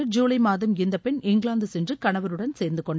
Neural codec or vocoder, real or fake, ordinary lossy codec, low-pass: none; real; none; none